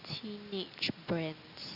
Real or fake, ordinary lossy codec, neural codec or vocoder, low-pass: real; Opus, 64 kbps; none; 5.4 kHz